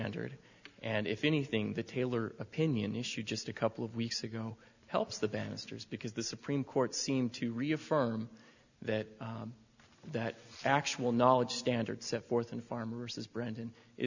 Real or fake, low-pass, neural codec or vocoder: real; 7.2 kHz; none